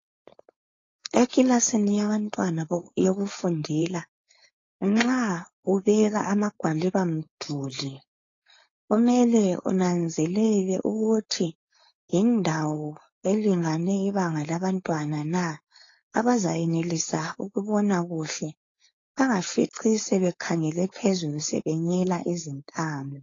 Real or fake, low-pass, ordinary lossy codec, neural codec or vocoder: fake; 7.2 kHz; AAC, 32 kbps; codec, 16 kHz, 4.8 kbps, FACodec